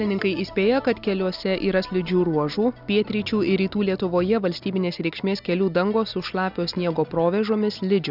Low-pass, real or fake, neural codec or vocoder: 5.4 kHz; real; none